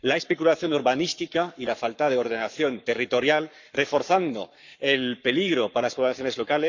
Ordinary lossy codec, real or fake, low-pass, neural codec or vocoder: none; fake; 7.2 kHz; codec, 44.1 kHz, 7.8 kbps, Pupu-Codec